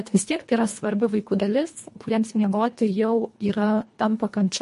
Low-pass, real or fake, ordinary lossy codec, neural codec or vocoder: 10.8 kHz; fake; MP3, 48 kbps; codec, 24 kHz, 1.5 kbps, HILCodec